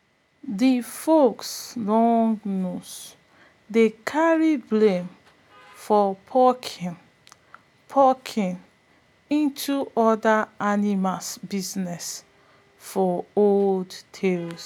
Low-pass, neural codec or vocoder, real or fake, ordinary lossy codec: 19.8 kHz; none; real; none